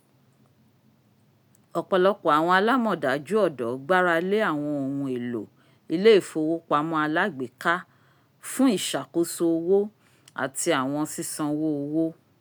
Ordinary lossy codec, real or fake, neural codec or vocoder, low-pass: none; real; none; none